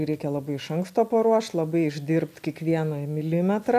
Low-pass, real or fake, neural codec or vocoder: 14.4 kHz; real; none